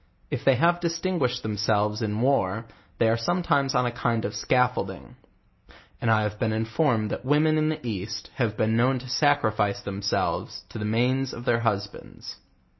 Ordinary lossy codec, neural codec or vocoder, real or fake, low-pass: MP3, 24 kbps; none; real; 7.2 kHz